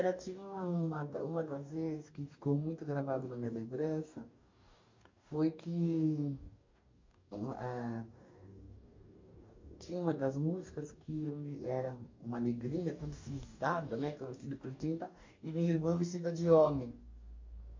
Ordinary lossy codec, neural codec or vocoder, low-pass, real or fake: MP3, 48 kbps; codec, 44.1 kHz, 2.6 kbps, DAC; 7.2 kHz; fake